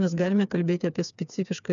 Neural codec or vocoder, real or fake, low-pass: codec, 16 kHz, 4 kbps, FreqCodec, smaller model; fake; 7.2 kHz